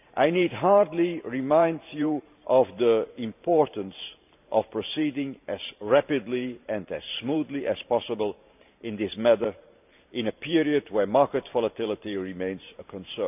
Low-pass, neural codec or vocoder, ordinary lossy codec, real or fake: 3.6 kHz; vocoder, 44.1 kHz, 128 mel bands every 512 samples, BigVGAN v2; none; fake